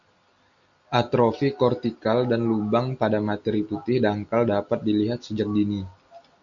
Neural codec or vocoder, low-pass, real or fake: none; 7.2 kHz; real